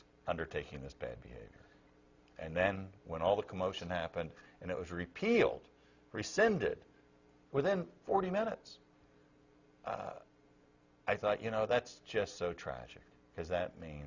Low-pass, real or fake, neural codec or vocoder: 7.2 kHz; real; none